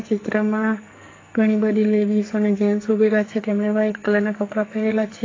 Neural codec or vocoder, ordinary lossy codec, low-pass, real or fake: codec, 44.1 kHz, 2.6 kbps, SNAC; AAC, 32 kbps; 7.2 kHz; fake